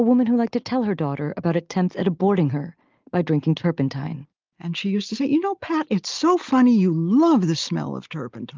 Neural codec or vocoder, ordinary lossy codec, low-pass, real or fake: none; Opus, 32 kbps; 7.2 kHz; real